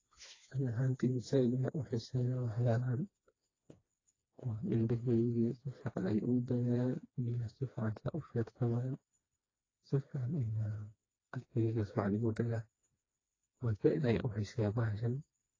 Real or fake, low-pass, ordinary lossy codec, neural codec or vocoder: fake; 7.2 kHz; AAC, 32 kbps; codec, 16 kHz, 2 kbps, FreqCodec, smaller model